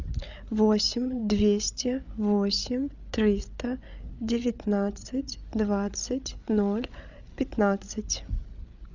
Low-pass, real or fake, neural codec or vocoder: 7.2 kHz; fake; codec, 16 kHz, 8 kbps, FreqCodec, larger model